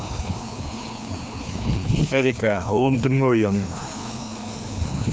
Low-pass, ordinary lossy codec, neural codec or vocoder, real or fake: none; none; codec, 16 kHz, 2 kbps, FreqCodec, larger model; fake